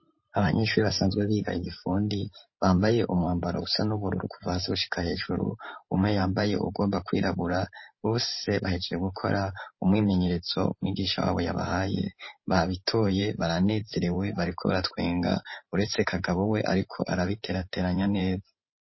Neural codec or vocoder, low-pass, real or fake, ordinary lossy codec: vocoder, 44.1 kHz, 128 mel bands every 512 samples, BigVGAN v2; 7.2 kHz; fake; MP3, 24 kbps